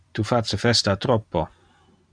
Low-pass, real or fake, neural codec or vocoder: 9.9 kHz; real; none